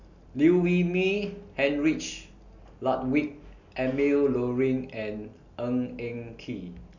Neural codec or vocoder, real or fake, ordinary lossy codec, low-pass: none; real; none; 7.2 kHz